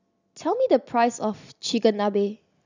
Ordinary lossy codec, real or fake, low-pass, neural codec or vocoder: none; real; 7.2 kHz; none